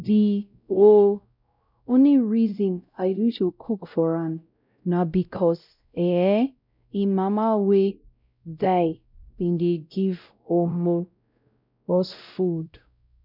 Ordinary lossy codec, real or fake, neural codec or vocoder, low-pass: none; fake; codec, 16 kHz, 0.5 kbps, X-Codec, WavLM features, trained on Multilingual LibriSpeech; 5.4 kHz